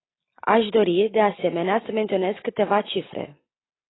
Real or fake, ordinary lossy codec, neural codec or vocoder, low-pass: real; AAC, 16 kbps; none; 7.2 kHz